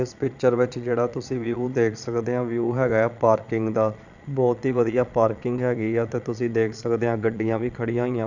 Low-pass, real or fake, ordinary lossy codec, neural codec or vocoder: 7.2 kHz; fake; none; vocoder, 22.05 kHz, 80 mel bands, Vocos